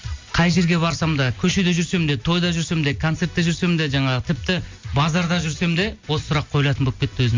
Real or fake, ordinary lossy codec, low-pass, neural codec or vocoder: real; MP3, 48 kbps; 7.2 kHz; none